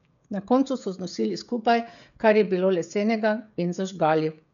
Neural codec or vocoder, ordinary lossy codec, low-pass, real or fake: codec, 16 kHz, 16 kbps, FreqCodec, smaller model; none; 7.2 kHz; fake